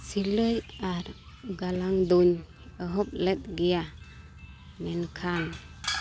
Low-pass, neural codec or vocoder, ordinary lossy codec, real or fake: none; none; none; real